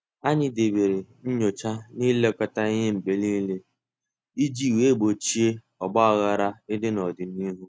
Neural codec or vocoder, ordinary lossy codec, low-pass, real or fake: none; none; none; real